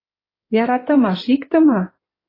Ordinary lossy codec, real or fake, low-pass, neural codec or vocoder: AAC, 24 kbps; fake; 5.4 kHz; codec, 16 kHz in and 24 kHz out, 2.2 kbps, FireRedTTS-2 codec